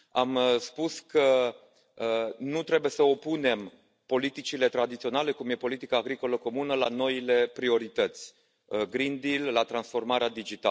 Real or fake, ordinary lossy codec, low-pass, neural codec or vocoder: real; none; none; none